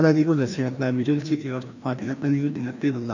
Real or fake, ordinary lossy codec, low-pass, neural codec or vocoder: fake; none; 7.2 kHz; codec, 16 kHz, 1 kbps, FreqCodec, larger model